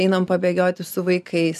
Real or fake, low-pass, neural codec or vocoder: fake; 14.4 kHz; vocoder, 44.1 kHz, 128 mel bands every 512 samples, BigVGAN v2